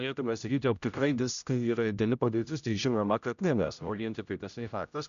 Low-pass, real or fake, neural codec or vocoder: 7.2 kHz; fake; codec, 16 kHz, 0.5 kbps, X-Codec, HuBERT features, trained on general audio